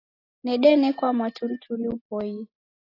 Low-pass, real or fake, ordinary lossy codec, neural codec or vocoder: 5.4 kHz; real; AAC, 24 kbps; none